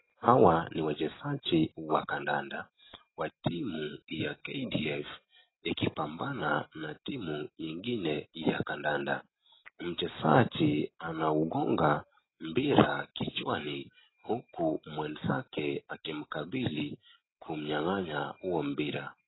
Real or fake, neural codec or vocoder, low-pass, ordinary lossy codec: real; none; 7.2 kHz; AAC, 16 kbps